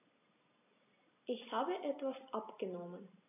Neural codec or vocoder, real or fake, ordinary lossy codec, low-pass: none; real; AAC, 24 kbps; 3.6 kHz